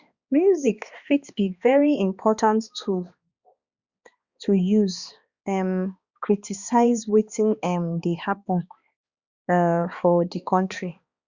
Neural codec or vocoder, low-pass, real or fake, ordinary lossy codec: codec, 16 kHz, 2 kbps, X-Codec, HuBERT features, trained on balanced general audio; 7.2 kHz; fake; Opus, 64 kbps